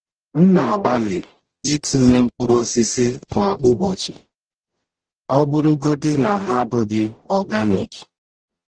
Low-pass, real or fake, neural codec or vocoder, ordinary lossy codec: 9.9 kHz; fake; codec, 44.1 kHz, 0.9 kbps, DAC; Opus, 16 kbps